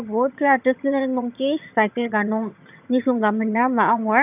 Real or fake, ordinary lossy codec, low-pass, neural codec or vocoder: fake; none; 3.6 kHz; vocoder, 22.05 kHz, 80 mel bands, HiFi-GAN